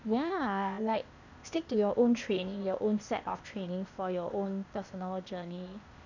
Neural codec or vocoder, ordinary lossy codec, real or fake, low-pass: codec, 16 kHz, 0.8 kbps, ZipCodec; none; fake; 7.2 kHz